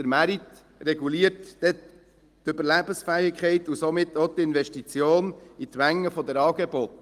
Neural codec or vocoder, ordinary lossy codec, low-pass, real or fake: none; Opus, 24 kbps; 14.4 kHz; real